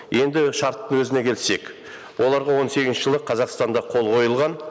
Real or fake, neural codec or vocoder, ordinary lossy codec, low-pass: real; none; none; none